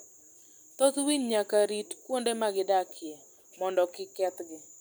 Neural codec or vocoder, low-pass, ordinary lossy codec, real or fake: none; none; none; real